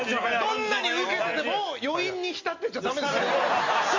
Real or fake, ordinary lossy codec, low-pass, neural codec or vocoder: real; MP3, 48 kbps; 7.2 kHz; none